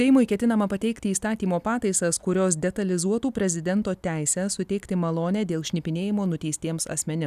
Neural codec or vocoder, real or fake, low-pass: none; real; 14.4 kHz